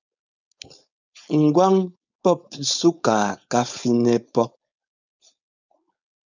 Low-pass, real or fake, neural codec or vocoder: 7.2 kHz; fake; codec, 16 kHz, 4.8 kbps, FACodec